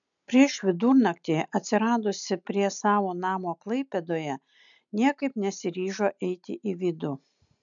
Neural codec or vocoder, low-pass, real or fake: none; 7.2 kHz; real